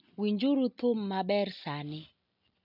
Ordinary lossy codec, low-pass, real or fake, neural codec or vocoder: none; 5.4 kHz; real; none